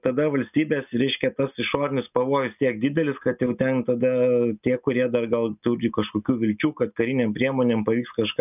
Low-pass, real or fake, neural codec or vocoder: 3.6 kHz; real; none